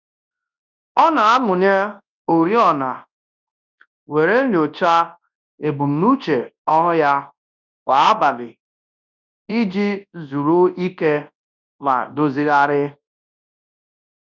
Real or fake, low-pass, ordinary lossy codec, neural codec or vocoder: fake; 7.2 kHz; none; codec, 24 kHz, 0.9 kbps, WavTokenizer, large speech release